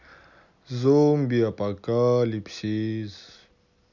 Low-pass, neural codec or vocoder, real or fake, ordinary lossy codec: 7.2 kHz; none; real; none